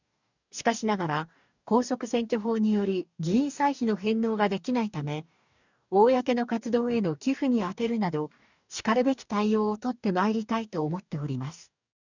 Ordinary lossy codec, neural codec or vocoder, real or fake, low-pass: none; codec, 44.1 kHz, 2.6 kbps, DAC; fake; 7.2 kHz